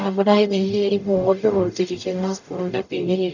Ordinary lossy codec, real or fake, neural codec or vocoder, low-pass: none; fake; codec, 44.1 kHz, 0.9 kbps, DAC; 7.2 kHz